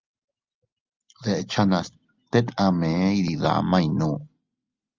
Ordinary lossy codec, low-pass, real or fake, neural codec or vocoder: Opus, 32 kbps; 7.2 kHz; real; none